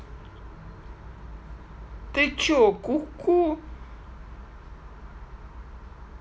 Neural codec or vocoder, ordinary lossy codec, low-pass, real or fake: none; none; none; real